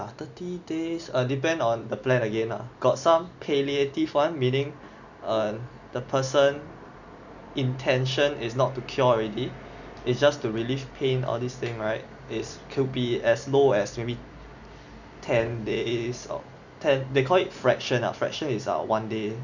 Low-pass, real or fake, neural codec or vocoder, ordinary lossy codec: 7.2 kHz; real; none; none